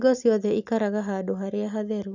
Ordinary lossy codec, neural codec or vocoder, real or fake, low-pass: none; none; real; 7.2 kHz